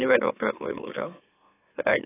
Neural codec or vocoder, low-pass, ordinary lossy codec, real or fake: autoencoder, 44.1 kHz, a latent of 192 numbers a frame, MeloTTS; 3.6 kHz; AAC, 16 kbps; fake